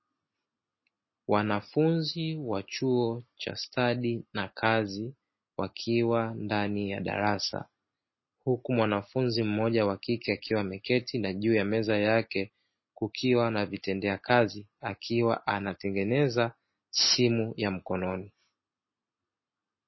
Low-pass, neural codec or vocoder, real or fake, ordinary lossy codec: 7.2 kHz; none; real; MP3, 24 kbps